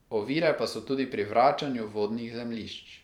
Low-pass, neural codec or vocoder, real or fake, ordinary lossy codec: 19.8 kHz; vocoder, 44.1 kHz, 128 mel bands every 512 samples, BigVGAN v2; fake; none